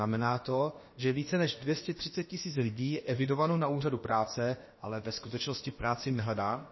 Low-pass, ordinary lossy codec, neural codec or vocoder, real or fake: 7.2 kHz; MP3, 24 kbps; codec, 16 kHz, about 1 kbps, DyCAST, with the encoder's durations; fake